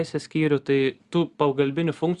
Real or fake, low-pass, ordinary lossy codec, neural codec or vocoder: real; 10.8 kHz; Opus, 64 kbps; none